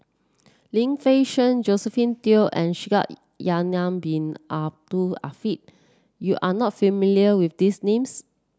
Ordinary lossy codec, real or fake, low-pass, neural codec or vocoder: none; real; none; none